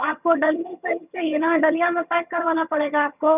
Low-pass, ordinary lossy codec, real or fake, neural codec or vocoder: 3.6 kHz; none; fake; vocoder, 22.05 kHz, 80 mel bands, HiFi-GAN